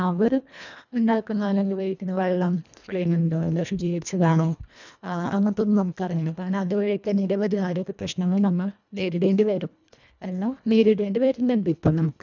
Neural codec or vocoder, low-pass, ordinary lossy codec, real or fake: codec, 24 kHz, 1.5 kbps, HILCodec; 7.2 kHz; none; fake